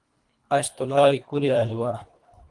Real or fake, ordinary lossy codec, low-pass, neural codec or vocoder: fake; Opus, 24 kbps; 10.8 kHz; codec, 24 kHz, 1.5 kbps, HILCodec